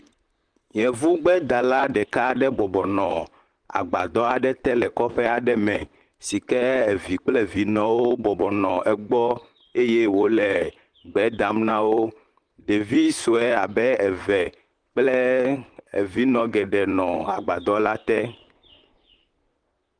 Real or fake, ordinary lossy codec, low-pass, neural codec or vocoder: fake; Opus, 24 kbps; 9.9 kHz; vocoder, 44.1 kHz, 128 mel bands, Pupu-Vocoder